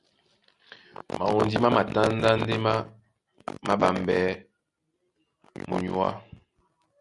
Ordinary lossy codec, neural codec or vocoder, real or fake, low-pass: Opus, 64 kbps; none; real; 10.8 kHz